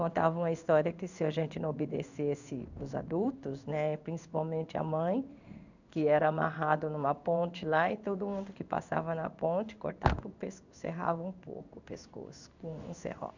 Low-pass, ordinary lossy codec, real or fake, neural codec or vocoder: 7.2 kHz; none; fake; codec, 16 kHz in and 24 kHz out, 1 kbps, XY-Tokenizer